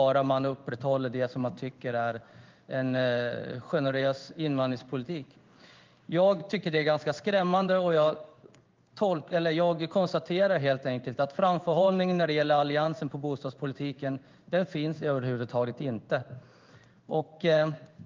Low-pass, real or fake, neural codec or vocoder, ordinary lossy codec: 7.2 kHz; fake; codec, 16 kHz in and 24 kHz out, 1 kbps, XY-Tokenizer; Opus, 24 kbps